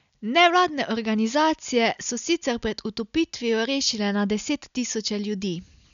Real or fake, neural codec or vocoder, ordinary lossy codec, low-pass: real; none; none; 7.2 kHz